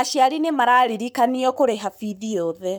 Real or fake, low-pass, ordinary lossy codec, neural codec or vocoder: fake; none; none; codec, 44.1 kHz, 7.8 kbps, Pupu-Codec